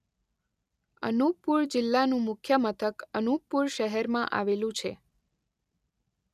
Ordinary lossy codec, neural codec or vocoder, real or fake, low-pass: none; none; real; 14.4 kHz